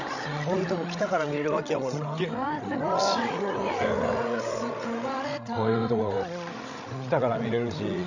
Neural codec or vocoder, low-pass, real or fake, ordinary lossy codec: codec, 16 kHz, 16 kbps, FreqCodec, larger model; 7.2 kHz; fake; none